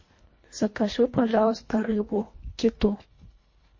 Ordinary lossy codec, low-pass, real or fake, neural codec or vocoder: MP3, 32 kbps; 7.2 kHz; fake; codec, 24 kHz, 1.5 kbps, HILCodec